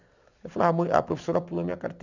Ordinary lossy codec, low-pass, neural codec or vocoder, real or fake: none; 7.2 kHz; none; real